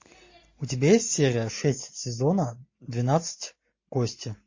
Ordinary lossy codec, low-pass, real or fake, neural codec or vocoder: MP3, 32 kbps; 7.2 kHz; real; none